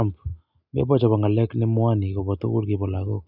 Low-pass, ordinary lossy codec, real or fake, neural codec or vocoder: 5.4 kHz; none; real; none